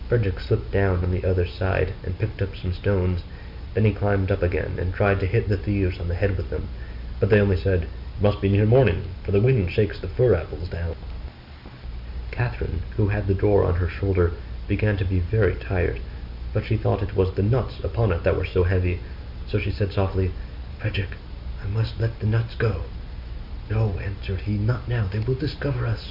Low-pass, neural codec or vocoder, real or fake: 5.4 kHz; none; real